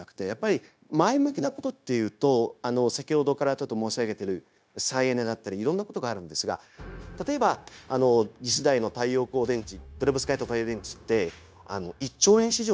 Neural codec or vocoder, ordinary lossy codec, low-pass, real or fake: codec, 16 kHz, 0.9 kbps, LongCat-Audio-Codec; none; none; fake